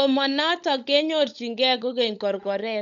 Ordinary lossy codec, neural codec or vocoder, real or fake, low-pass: none; codec, 16 kHz, 16 kbps, FunCodec, trained on LibriTTS, 50 frames a second; fake; 7.2 kHz